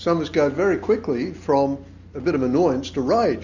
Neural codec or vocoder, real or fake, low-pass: none; real; 7.2 kHz